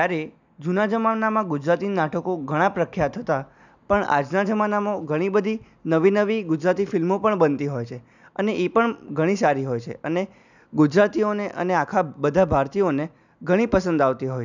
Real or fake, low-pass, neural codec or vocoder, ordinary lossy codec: real; 7.2 kHz; none; none